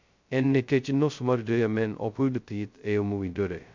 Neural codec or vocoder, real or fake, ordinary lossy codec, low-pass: codec, 16 kHz, 0.2 kbps, FocalCodec; fake; MP3, 64 kbps; 7.2 kHz